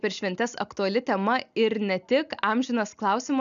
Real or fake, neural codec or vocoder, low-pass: real; none; 7.2 kHz